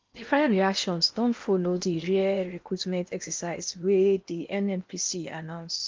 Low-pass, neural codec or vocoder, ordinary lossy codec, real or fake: 7.2 kHz; codec, 16 kHz in and 24 kHz out, 0.8 kbps, FocalCodec, streaming, 65536 codes; Opus, 32 kbps; fake